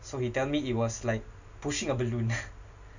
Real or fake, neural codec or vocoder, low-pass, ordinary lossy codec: real; none; 7.2 kHz; none